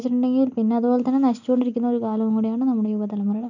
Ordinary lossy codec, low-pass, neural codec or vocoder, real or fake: none; 7.2 kHz; none; real